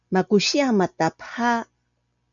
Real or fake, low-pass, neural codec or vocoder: real; 7.2 kHz; none